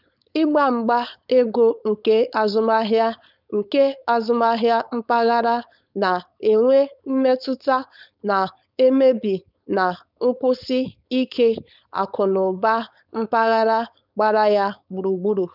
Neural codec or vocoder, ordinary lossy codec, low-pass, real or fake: codec, 16 kHz, 4.8 kbps, FACodec; none; 5.4 kHz; fake